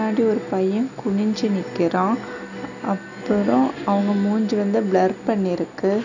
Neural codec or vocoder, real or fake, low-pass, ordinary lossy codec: none; real; 7.2 kHz; none